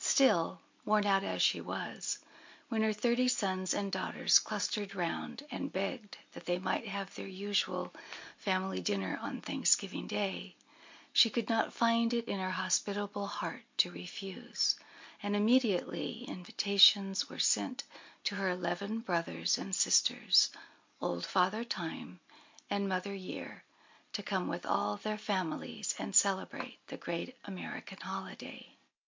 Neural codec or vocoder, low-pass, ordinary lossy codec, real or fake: none; 7.2 kHz; MP3, 48 kbps; real